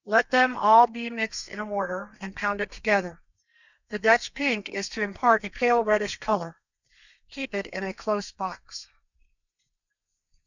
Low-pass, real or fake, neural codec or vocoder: 7.2 kHz; fake; codec, 32 kHz, 1.9 kbps, SNAC